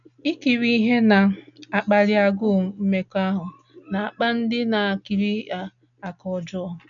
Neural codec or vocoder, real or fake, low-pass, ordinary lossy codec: none; real; 7.2 kHz; none